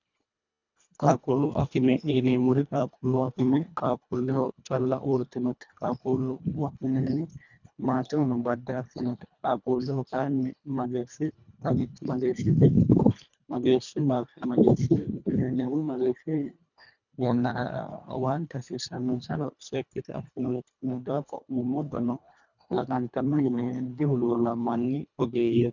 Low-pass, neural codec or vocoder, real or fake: 7.2 kHz; codec, 24 kHz, 1.5 kbps, HILCodec; fake